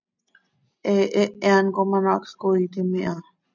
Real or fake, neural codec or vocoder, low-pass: real; none; 7.2 kHz